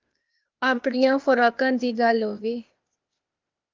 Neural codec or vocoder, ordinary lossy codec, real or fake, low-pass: codec, 16 kHz, 0.8 kbps, ZipCodec; Opus, 24 kbps; fake; 7.2 kHz